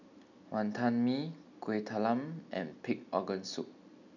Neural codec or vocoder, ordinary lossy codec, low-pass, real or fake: none; none; 7.2 kHz; real